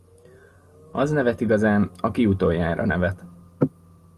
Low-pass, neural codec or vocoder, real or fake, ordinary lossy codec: 14.4 kHz; none; real; Opus, 24 kbps